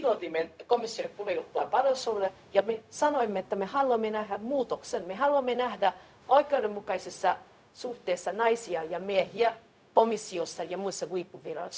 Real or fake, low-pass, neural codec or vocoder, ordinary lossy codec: fake; none; codec, 16 kHz, 0.4 kbps, LongCat-Audio-Codec; none